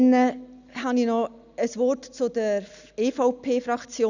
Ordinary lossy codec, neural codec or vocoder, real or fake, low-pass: none; none; real; 7.2 kHz